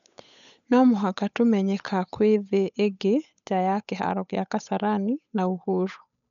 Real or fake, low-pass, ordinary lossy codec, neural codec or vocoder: fake; 7.2 kHz; none; codec, 16 kHz, 8 kbps, FunCodec, trained on Chinese and English, 25 frames a second